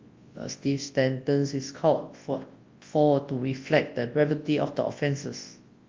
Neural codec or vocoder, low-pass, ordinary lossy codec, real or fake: codec, 24 kHz, 0.9 kbps, WavTokenizer, large speech release; 7.2 kHz; Opus, 32 kbps; fake